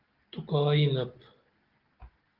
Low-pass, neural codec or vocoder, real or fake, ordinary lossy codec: 5.4 kHz; none; real; Opus, 16 kbps